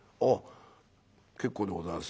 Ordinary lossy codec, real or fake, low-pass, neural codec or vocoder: none; real; none; none